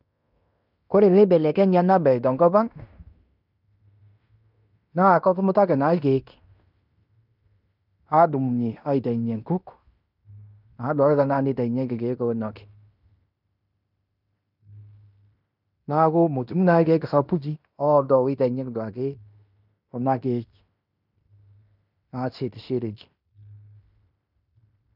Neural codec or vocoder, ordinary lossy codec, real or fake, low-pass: codec, 16 kHz in and 24 kHz out, 0.9 kbps, LongCat-Audio-Codec, fine tuned four codebook decoder; none; fake; 5.4 kHz